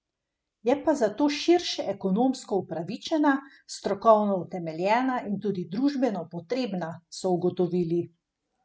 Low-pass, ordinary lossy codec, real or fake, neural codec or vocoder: none; none; real; none